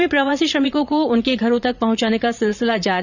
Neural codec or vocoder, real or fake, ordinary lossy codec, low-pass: vocoder, 44.1 kHz, 80 mel bands, Vocos; fake; none; 7.2 kHz